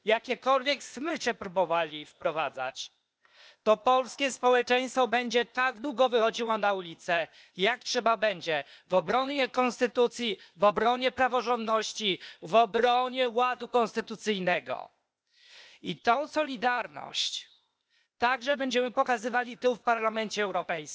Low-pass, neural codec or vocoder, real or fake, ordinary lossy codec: none; codec, 16 kHz, 0.8 kbps, ZipCodec; fake; none